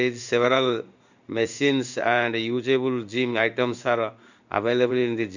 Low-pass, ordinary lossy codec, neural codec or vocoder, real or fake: 7.2 kHz; none; codec, 16 kHz in and 24 kHz out, 1 kbps, XY-Tokenizer; fake